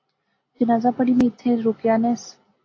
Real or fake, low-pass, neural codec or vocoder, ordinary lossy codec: real; 7.2 kHz; none; AAC, 32 kbps